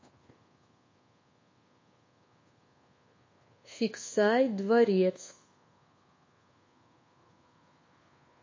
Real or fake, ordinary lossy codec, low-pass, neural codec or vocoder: fake; MP3, 32 kbps; 7.2 kHz; codec, 24 kHz, 1.2 kbps, DualCodec